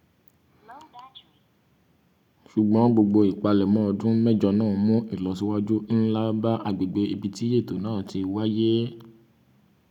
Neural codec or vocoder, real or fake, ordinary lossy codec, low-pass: none; real; none; 19.8 kHz